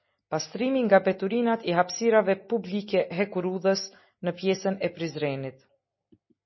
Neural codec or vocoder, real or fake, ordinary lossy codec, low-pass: none; real; MP3, 24 kbps; 7.2 kHz